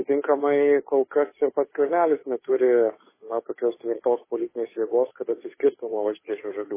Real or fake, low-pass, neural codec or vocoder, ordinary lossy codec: fake; 3.6 kHz; codec, 16 kHz, 2 kbps, FunCodec, trained on Chinese and English, 25 frames a second; MP3, 16 kbps